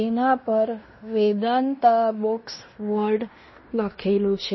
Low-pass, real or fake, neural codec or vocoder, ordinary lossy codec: 7.2 kHz; fake; codec, 16 kHz in and 24 kHz out, 0.9 kbps, LongCat-Audio-Codec, fine tuned four codebook decoder; MP3, 24 kbps